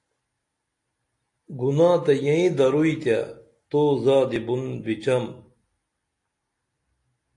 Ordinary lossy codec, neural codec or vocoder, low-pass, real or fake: AAC, 48 kbps; none; 10.8 kHz; real